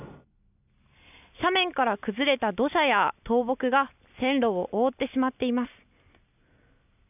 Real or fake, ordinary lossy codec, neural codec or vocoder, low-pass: real; none; none; 3.6 kHz